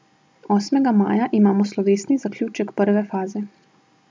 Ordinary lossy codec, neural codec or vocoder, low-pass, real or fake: none; none; none; real